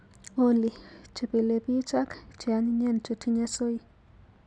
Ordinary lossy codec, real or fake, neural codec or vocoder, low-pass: none; real; none; 9.9 kHz